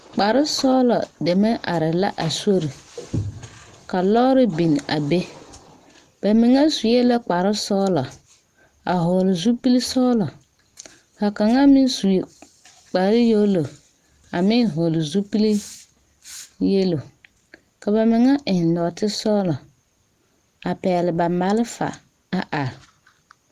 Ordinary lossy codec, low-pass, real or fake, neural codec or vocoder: Opus, 24 kbps; 14.4 kHz; real; none